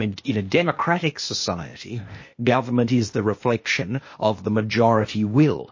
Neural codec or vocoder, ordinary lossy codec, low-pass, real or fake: codec, 16 kHz, 0.8 kbps, ZipCodec; MP3, 32 kbps; 7.2 kHz; fake